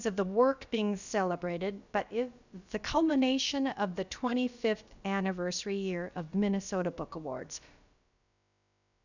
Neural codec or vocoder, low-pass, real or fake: codec, 16 kHz, about 1 kbps, DyCAST, with the encoder's durations; 7.2 kHz; fake